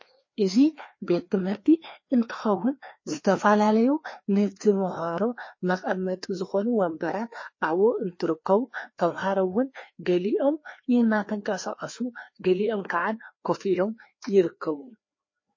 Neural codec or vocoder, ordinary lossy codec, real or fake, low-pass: codec, 16 kHz, 2 kbps, FreqCodec, larger model; MP3, 32 kbps; fake; 7.2 kHz